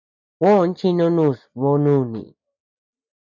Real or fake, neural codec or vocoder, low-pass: real; none; 7.2 kHz